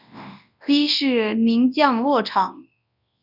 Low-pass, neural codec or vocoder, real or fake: 5.4 kHz; codec, 24 kHz, 0.9 kbps, WavTokenizer, large speech release; fake